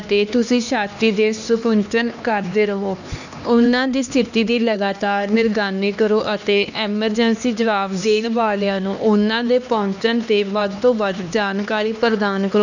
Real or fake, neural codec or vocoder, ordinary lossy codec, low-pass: fake; codec, 16 kHz, 2 kbps, X-Codec, HuBERT features, trained on LibriSpeech; none; 7.2 kHz